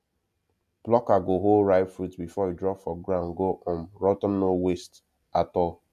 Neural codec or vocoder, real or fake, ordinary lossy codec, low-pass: none; real; none; 14.4 kHz